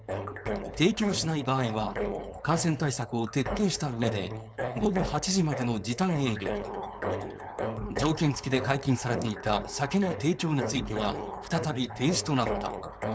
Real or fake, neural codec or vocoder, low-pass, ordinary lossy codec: fake; codec, 16 kHz, 4.8 kbps, FACodec; none; none